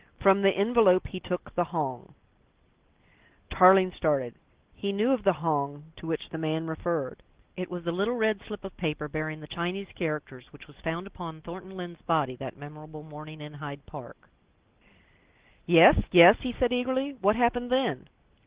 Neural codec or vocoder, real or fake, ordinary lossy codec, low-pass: none; real; Opus, 16 kbps; 3.6 kHz